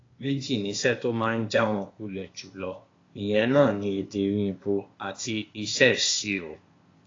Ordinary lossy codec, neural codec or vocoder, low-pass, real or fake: AAC, 32 kbps; codec, 16 kHz, 0.8 kbps, ZipCodec; 7.2 kHz; fake